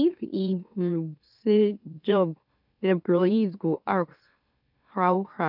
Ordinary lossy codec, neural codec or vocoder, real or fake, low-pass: none; autoencoder, 44.1 kHz, a latent of 192 numbers a frame, MeloTTS; fake; 5.4 kHz